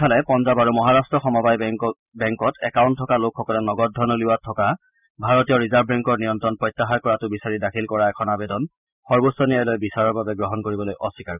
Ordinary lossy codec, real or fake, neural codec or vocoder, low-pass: none; real; none; 3.6 kHz